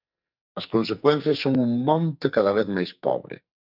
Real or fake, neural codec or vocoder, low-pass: fake; codec, 44.1 kHz, 2.6 kbps, SNAC; 5.4 kHz